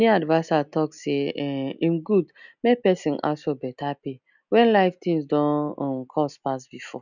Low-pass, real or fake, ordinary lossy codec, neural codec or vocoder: 7.2 kHz; real; none; none